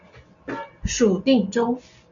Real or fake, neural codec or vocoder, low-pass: real; none; 7.2 kHz